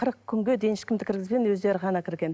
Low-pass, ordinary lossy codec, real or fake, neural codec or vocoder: none; none; real; none